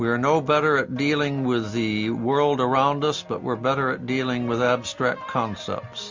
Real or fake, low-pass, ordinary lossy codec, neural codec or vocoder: real; 7.2 kHz; MP3, 64 kbps; none